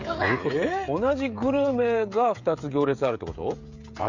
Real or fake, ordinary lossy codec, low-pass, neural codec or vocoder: fake; Opus, 64 kbps; 7.2 kHz; codec, 16 kHz, 16 kbps, FreqCodec, smaller model